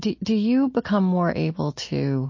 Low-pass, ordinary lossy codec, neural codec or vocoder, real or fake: 7.2 kHz; MP3, 32 kbps; none; real